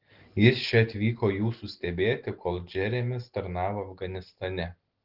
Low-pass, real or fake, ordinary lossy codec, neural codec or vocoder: 5.4 kHz; real; Opus, 16 kbps; none